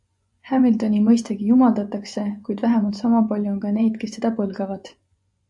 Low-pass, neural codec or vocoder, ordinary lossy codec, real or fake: 10.8 kHz; vocoder, 24 kHz, 100 mel bands, Vocos; AAC, 64 kbps; fake